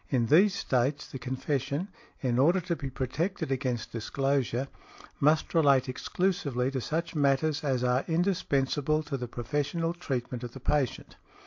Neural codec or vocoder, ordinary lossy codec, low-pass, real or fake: none; MP3, 48 kbps; 7.2 kHz; real